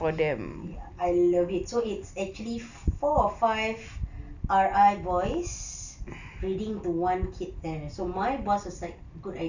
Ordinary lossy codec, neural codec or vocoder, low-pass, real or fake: none; none; 7.2 kHz; real